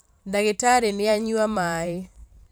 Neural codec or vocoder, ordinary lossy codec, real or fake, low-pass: vocoder, 44.1 kHz, 128 mel bands every 512 samples, BigVGAN v2; none; fake; none